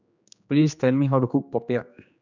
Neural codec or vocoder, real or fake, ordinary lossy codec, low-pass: codec, 16 kHz, 2 kbps, X-Codec, HuBERT features, trained on general audio; fake; none; 7.2 kHz